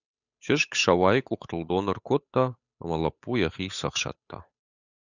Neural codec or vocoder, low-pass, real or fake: codec, 16 kHz, 8 kbps, FunCodec, trained on Chinese and English, 25 frames a second; 7.2 kHz; fake